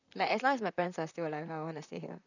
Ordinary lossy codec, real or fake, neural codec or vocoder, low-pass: none; fake; vocoder, 44.1 kHz, 128 mel bands, Pupu-Vocoder; 7.2 kHz